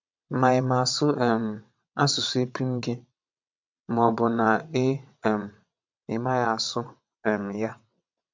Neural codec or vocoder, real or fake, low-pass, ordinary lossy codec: vocoder, 22.05 kHz, 80 mel bands, WaveNeXt; fake; 7.2 kHz; MP3, 64 kbps